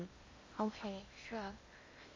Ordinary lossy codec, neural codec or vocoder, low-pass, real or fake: MP3, 32 kbps; codec, 16 kHz in and 24 kHz out, 0.8 kbps, FocalCodec, streaming, 65536 codes; 7.2 kHz; fake